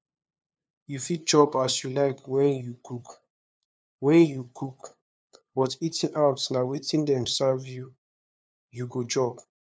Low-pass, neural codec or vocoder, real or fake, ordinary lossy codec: none; codec, 16 kHz, 2 kbps, FunCodec, trained on LibriTTS, 25 frames a second; fake; none